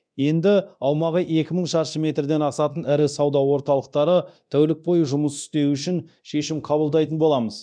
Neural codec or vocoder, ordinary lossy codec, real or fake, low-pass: codec, 24 kHz, 0.9 kbps, DualCodec; none; fake; 9.9 kHz